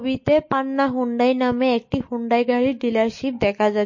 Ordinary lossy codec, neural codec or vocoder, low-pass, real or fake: MP3, 32 kbps; none; 7.2 kHz; real